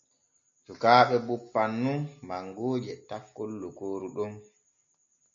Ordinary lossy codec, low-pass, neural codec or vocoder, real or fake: AAC, 64 kbps; 7.2 kHz; none; real